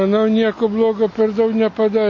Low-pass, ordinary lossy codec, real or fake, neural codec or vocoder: 7.2 kHz; MP3, 32 kbps; real; none